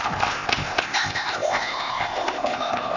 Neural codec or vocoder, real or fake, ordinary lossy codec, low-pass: codec, 16 kHz, 0.8 kbps, ZipCodec; fake; none; 7.2 kHz